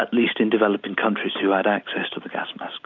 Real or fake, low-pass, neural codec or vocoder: real; 7.2 kHz; none